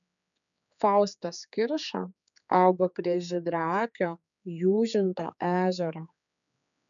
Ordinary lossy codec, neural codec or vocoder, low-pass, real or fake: MP3, 96 kbps; codec, 16 kHz, 4 kbps, X-Codec, HuBERT features, trained on general audio; 7.2 kHz; fake